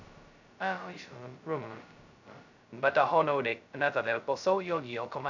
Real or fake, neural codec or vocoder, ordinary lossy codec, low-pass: fake; codec, 16 kHz, 0.2 kbps, FocalCodec; none; 7.2 kHz